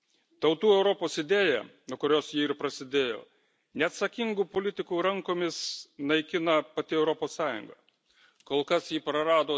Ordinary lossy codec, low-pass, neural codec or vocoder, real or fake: none; none; none; real